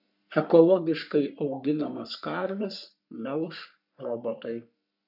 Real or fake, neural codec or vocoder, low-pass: fake; codec, 44.1 kHz, 3.4 kbps, Pupu-Codec; 5.4 kHz